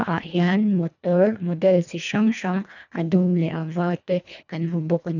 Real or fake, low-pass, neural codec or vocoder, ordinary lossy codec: fake; 7.2 kHz; codec, 24 kHz, 1.5 kbps, HILCodec; none